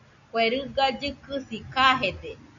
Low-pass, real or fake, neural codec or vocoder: 7.2 kHz; real; none